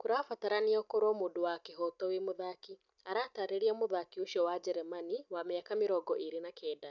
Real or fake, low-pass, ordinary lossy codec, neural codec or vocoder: real; 7.2 kHz; none; none